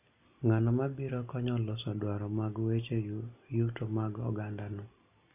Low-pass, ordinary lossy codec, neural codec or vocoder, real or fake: 3.6 kHz; none; none; real